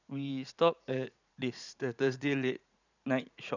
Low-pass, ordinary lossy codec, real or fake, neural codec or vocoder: 7.2 kHz; none; fake; vocoder, 44.1 kHz, 80 mel bands, Vocos